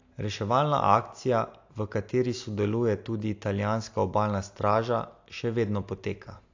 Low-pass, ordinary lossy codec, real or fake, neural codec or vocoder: 7.2 kHz; AAC, 48 kbps; real; none